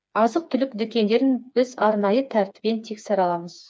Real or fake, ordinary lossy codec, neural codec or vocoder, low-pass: fake; none; codec, 16 kHz, 4 kbps, FreqCodec, smaller model; none